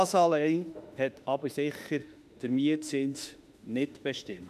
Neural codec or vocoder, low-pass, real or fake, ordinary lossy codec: autoencoder, 48 kHz, 32 numbers a frame, DAC-VAE, trained on Japanese speech; 14.4 kHz; fake; none